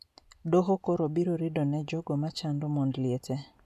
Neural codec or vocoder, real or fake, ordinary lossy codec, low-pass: none; real; none; 14.4 kHz